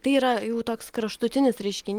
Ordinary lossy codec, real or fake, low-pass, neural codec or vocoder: Opus, 24 kbps; real; 19.8 kHz; none